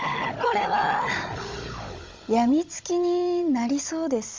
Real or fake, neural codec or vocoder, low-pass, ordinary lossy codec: fake; codec, 16 kHz, 16 kbps, FunCodec, trained on Chinese and English, 50 frames a second; 7.2 kHz; Opus, 32 kbps